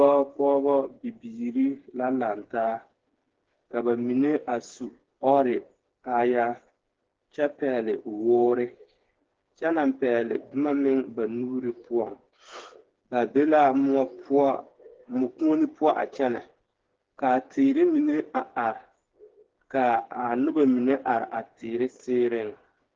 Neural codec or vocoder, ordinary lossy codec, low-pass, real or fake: codec, 16 kHz, 4 kbps, FreqCodec, smaller model; Opus, 16 kbps; 7.2 kHz; fake